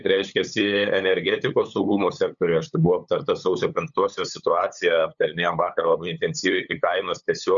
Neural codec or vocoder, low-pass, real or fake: codec, 16 kHz, 8 kbps, FunCodec, trained on LibriTTS, 25 frames a second; 7.2 kHz; fake